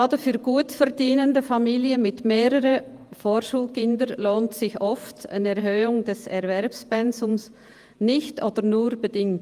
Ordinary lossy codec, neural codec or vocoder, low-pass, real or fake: Opus, 32 kbps; vocoder, 48 kHz, 128 mel bands, Vocos; 14.4 kHz; fake